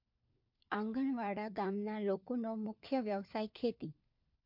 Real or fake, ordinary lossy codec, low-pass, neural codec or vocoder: fake; MP3, 48 kbps; 5.4 kHz; codec, 16 kHz, 4 kbps, FunCodec, trained on LibriTTS, 50 frames a second